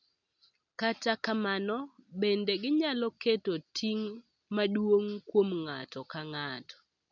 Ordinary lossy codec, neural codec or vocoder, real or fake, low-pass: none; none; real; 7.2 kHz